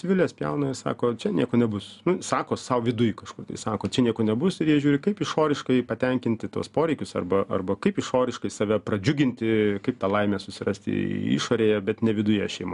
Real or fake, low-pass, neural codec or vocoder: real; 10.8 kHz; none